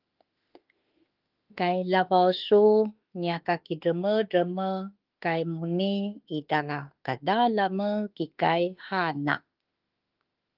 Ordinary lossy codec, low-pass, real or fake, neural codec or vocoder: Opus, 32 kbps; 5.4 kHz; fake; autoencoder, 48 kHz, 32 numbers a frame, DAC-VAE, trained on Japanese speech